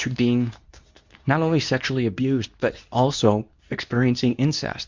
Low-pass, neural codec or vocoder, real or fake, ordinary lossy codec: 7.2 kHz; codec, 24 kHz, 0.9 kbps, WavTokenizer, medium speech release version 2; fake; MP3, 64 kbps